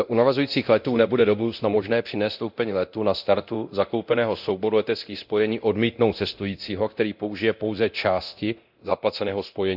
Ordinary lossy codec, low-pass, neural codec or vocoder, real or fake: none; 5.4 kHz; codec, 24 kHz, 0.9 kbps, DualCodec; fake